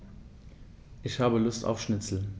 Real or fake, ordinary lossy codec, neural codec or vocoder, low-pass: real; none; none; none